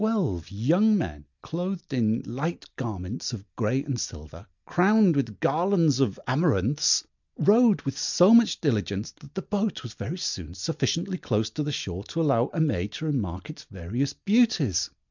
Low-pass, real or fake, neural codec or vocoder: 7.2 kHz; real; none